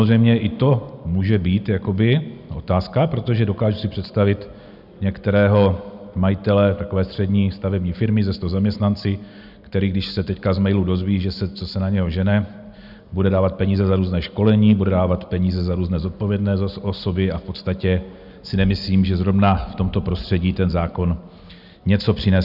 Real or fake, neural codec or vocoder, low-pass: real; none; 5.4 kHz